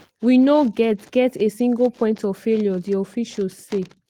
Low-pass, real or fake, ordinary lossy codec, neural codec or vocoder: 19.8 kHz; real; Opus, 16 kbps; none